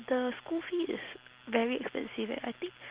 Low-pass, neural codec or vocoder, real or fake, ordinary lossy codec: 3.6 kHz; none; real; Opus, 16 kbps